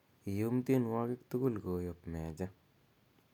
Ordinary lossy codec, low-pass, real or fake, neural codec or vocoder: none; 19.8 kHz; real; none